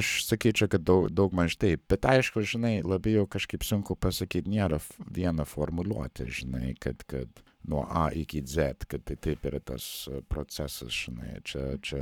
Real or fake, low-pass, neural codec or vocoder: fake; 19.8 kHz; codec, 44.1 kHz, 7.8 kbps, Pupu-Codec